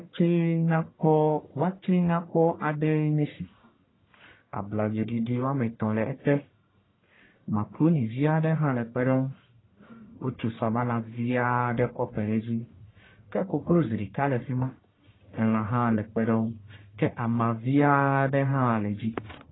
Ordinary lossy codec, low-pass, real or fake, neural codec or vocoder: AAC, 16 kbps; 7.2 kHz; fake; codec, 44.1 kHz, 1.7 kbps, Pupu-Codec